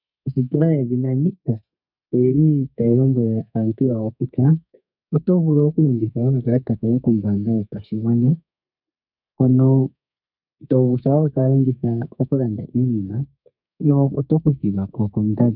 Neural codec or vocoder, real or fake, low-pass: codec, 32 kHz, 1.9 kbps, SNAC; fake; 5.4 kHz